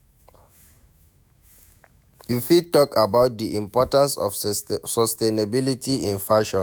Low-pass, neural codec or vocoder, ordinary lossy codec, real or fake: none; autoencoder, 48 kHz, 128 numbers a frame, DAC-VAE, trained on Japanese speech; none; fake